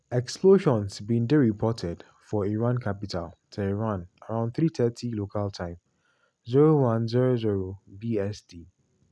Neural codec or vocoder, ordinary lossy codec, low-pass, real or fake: none; none; none; real